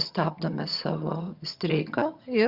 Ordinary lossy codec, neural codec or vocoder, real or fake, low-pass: Opus, 64 kbps; vocoder, 22.05 kHz, 80 mel bands, HiFi-GAN; fake; 5.4 kHz